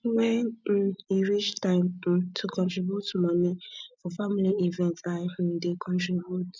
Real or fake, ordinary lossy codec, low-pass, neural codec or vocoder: real; none; none; none